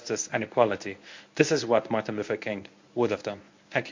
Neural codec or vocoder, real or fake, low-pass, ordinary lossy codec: codec, 24 kHz, 0.9 kbps, WavTokenizer, medium speech release version 1; fake; 7.2 kHz; MP3, 48 kbps